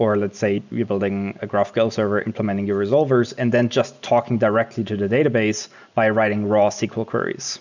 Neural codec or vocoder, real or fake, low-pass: none; real; 7.2 kHz